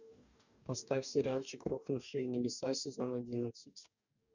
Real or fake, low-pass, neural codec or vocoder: fake; 7.2 kHz; codec, 44.1 kHz, 2.6 kbps, DAC